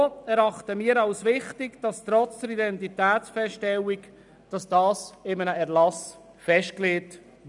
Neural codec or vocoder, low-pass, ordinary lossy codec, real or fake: none; 10.8 kHz; none; real